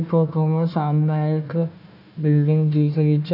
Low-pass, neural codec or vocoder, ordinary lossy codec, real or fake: 5.4 kHz; codec, 16 kHz, 1 kbps, FunCodec, trained on Chinese and English, 50 frames a second; none; fake